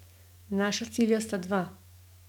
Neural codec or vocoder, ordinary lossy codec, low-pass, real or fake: codec, 44.1 kHz, 7.8 kbps, DAC; none; 19.8 kHz; fake